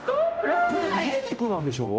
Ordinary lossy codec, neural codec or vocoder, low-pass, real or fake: none; codec, 16 kHz, 0.5 kbps, X-Codec, HuBERT features, trained on general audio; none; fake